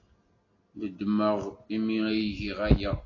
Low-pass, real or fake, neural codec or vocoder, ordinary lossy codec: 7.2 kHz; real; none; AAC, 32 kbps